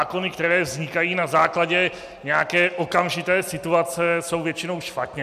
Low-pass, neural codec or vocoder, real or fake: 14.4 kHz; none; real